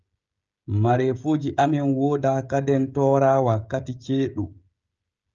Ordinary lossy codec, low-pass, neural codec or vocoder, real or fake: Opus, 24 kbps; 7.2 kHz; codec, 16 kHz, 16 kbps, FreqCodec, smaller model; fake